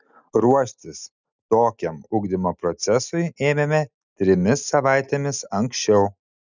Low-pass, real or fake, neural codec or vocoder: 7.2 kHz; real; none